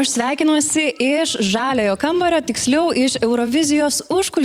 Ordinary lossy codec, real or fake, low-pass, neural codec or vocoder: Opus, 64 kbps; fake; 19.8 kHz; vocoder, 44.1 kHz, 128 mel bands every 512 samples, BigVGAN v2